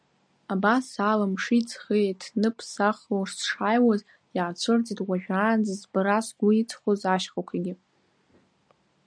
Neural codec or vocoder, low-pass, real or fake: none; 9.9 kHz; real